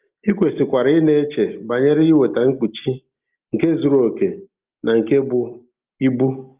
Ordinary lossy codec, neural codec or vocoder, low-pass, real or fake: Opus, 32 kbps; none; 3.6 kHz; real